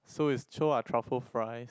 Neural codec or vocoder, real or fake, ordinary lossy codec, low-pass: none; real; none; none